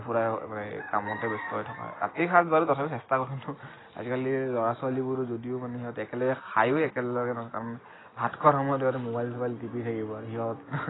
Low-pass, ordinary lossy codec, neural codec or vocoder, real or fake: 7.2 kHz; AAC, 16 kbps; none; real